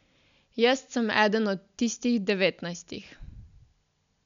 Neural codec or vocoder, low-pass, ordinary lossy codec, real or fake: none; 7.2 kHz; none; real